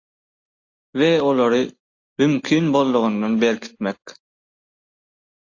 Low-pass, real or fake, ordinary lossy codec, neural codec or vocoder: 7.2 kHz; real; AAC, 48 kbps; none